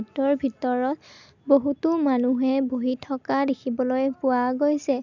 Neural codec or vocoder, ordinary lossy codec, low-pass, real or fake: none; none; 7.2 kHz; real